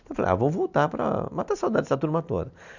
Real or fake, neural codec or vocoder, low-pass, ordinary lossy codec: real; none; 7.2 kHz; none